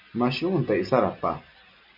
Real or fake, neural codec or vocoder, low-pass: real; none; 5.4 kHz